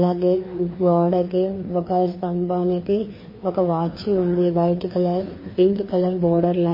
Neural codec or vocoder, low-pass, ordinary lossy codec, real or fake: codec, 16 kHz, 2 kbps, FreqCodec, larger model; 5.4 kHz; MP3, 24 kbps; fake